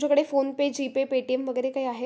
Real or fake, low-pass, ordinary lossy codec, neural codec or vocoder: real; none; none; none